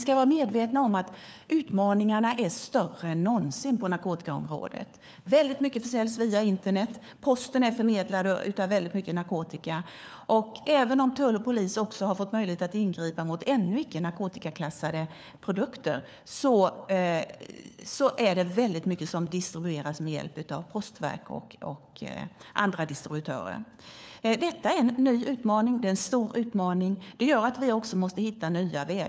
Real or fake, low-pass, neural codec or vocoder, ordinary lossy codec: fake; none; codec, 16 kHz, 4 kbps, FunCodec, trained on LibriTTS, 50 frames a second; none